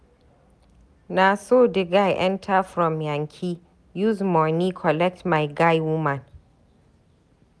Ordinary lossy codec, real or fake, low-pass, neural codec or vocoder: none; real; none; none